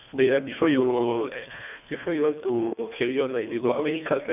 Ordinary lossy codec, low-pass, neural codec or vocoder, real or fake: none; 3.6 kHz; codec, 24 kHz, 1.5 kbps, HILCodec; fake